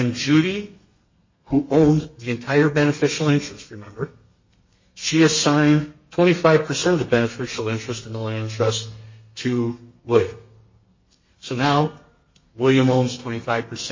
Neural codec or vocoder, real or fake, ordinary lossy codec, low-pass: codec, 32 kHz, 1.9 kbps, SNAC; fake; MP3, 32 kbps; 7.2 kHz